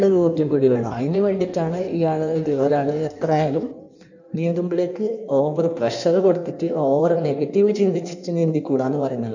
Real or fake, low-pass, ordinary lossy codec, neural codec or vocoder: fake; 7.2 kHz; none; codec, 16 kHz in and 24 kHz out, 1.1 kbps, FireRedTTS-2 codec